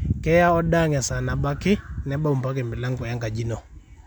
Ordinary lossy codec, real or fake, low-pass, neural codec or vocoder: none; real; 19.8 kHz; none